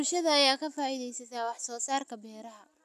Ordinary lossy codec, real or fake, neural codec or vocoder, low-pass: none; real; none; 14.4 kHz